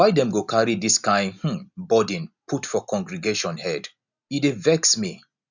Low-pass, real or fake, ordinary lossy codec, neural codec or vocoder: 7.2 kHz; real; none; none